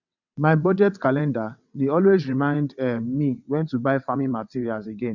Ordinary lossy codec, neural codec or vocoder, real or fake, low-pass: none; vocoder, 22.05 kHz, 80 mel bands, WaveNeXt; fake; 7.2 kHz